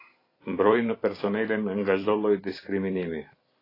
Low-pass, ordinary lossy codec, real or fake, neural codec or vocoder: 5.4 kHz; AAC, 24 kbps; fake; vocoder, 44.1 kHz, 128 mel bands every 256 samples, BigVGAN v2